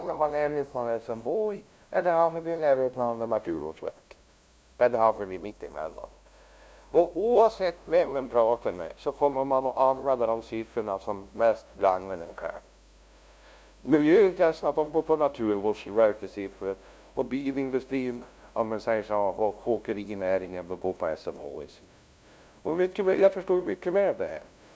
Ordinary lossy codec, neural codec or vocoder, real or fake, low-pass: none; codec, 16 kHz, 0.5 kbps, FunCodec, trained on LibriTTS, 25 frames a second; fake; none